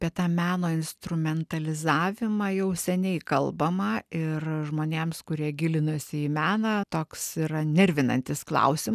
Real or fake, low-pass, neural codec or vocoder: real; 14.4 kHz; none